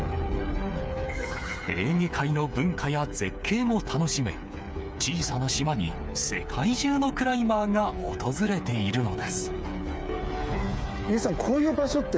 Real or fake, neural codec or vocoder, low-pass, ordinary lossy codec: fake; codec, 16 kHz, 8 kbps, FreqCodec, smaller model; none; none